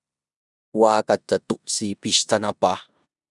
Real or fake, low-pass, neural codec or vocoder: fake; 10.8 kHz; codec, 16 kHz in and 24 kHz out, 0.9 kbps, LongCat-Audio-Codec, four codebook decoder